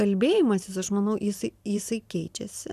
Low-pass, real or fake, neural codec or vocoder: 14.4 kHz; fake; vocoder, 44.1 kHz, 128 mel bands every 512 samples, BigVGAN v2